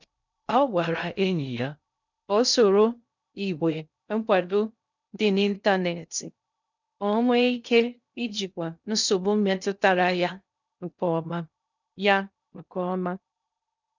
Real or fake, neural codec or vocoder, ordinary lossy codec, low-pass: fake; codec, 16 kHz in and 24 kHz out, 0.6 kbps, FocalCodec, streaming, 2048 codes; none; 7.2 kHz